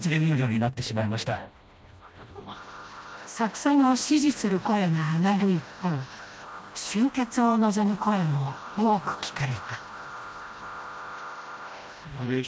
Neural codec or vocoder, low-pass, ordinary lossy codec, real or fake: codec, 16 kHz, 1 kbps, FreqCodec, smaller model; none; none; fake